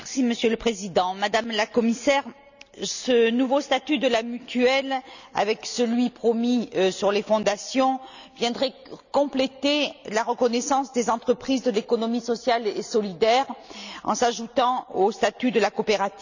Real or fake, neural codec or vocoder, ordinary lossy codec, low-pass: real; none; none; 7.2 kHz